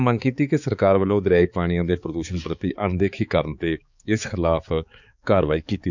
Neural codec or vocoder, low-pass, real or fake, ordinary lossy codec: codec, 16 kHz, 4 kbps, X-Codec, HuBERT features, trained on balanced general audio; 7.2 kHz; fake; none